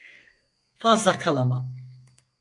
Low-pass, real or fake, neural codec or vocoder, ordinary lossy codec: 10.8 kHz; fake; codec, 32 kHz, 1.9 kbps, SNAC; MP3, 64 kbps